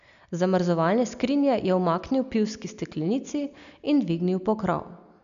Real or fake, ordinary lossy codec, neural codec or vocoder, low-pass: real; AAC, 96 kbps; none; 7.2 kHz